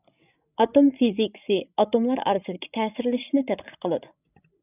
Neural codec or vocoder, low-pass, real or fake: codec, 16 kHz, 16 kbps, FreqCodec, larger model; 3.6 kHz; fake